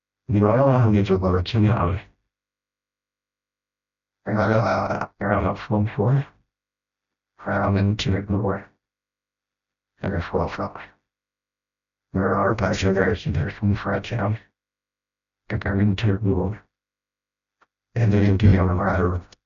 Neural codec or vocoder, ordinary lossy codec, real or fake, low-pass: codec, 16 kHz, 0.5 kbps, FreqCodec, smaller model; none; fake; 7.2 kHz